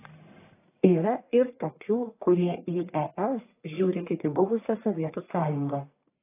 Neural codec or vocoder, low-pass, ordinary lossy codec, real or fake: codec, 44.1 kHz, 1.7 kbps, Pupu-Codec; 3.6 kHz; AAC, 24 kbps; fake